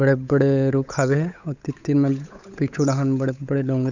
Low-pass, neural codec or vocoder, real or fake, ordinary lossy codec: 7.2 kHz; codec, 16 kHz, 8 kbps, FunCodec, trained on Chinese and English, 25 frames a second; fake; none